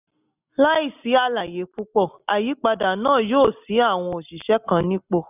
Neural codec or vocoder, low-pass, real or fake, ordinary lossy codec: none; 3.6 kHz; real; none